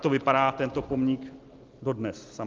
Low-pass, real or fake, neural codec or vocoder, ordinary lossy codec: 7.2 kHz; real; none; Opus, 24 kbps